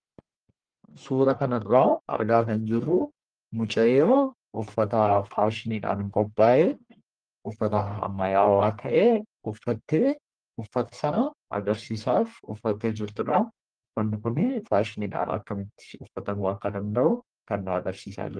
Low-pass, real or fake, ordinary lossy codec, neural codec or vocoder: 9.9 kHz; fake; Opus, 24 kbps; codec, 44.1 kHz, 1.7 kbps, Pupu-Codec